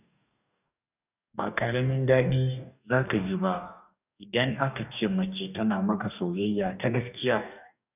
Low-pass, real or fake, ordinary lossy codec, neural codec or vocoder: 3.6 kHz; fake; none; codec, 44.1 kHz, 2.6 kbps, DAC